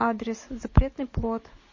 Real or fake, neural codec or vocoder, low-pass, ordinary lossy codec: real; none; 7.2 kHz; MP3, 32 kbps